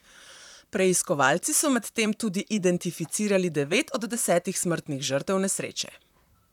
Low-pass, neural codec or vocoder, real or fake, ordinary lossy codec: 19.8 kHz; vocoder, 44.1 kHz, 128 mel bands, Pupu-Vocoder; fake; none